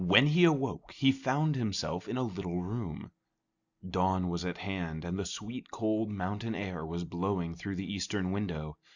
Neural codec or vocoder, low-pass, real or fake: none; 7.2 kHz; real